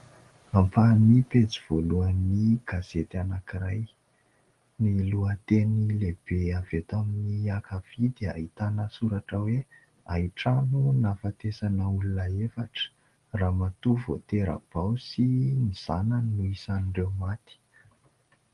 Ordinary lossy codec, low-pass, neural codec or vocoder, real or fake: Opus, 24 kbps; 10.8 kHz; none; real